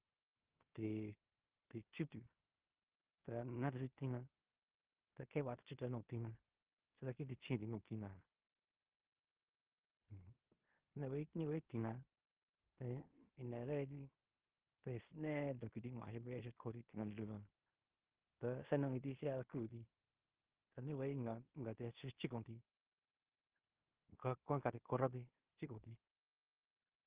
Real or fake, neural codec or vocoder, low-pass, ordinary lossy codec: fake; codec, 16 kHz in and 24 kHz out, 0.9 kbps, LongCat-Audio-Codec, fine tuned four codebook decoder; 3.6 kHz; Opus, 16 kbps